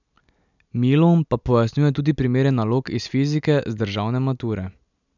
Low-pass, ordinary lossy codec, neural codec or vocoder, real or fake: 7.2 kHz; none; none; real